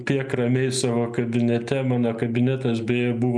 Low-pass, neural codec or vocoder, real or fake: 9.9 kHz; none; real